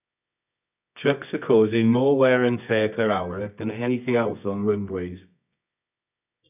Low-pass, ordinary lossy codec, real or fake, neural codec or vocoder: 3.6 kHz; none; fake; codec, 24 kHz, 0.9 kbps, WavTokenizer, medium music audio release